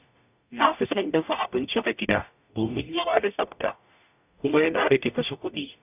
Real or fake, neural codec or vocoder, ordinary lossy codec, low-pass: fake; codec, 44.1 kHz, 0.9 kbps, DAC; none; 3.6 kHz